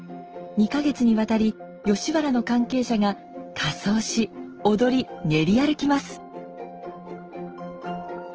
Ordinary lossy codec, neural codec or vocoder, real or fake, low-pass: Opus, 16 kbps; none; real; 7.2 kHz